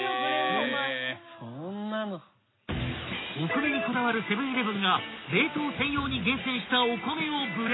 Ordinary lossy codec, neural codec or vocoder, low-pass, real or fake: AAC, 16 kbps; none; 7.2 kHz; real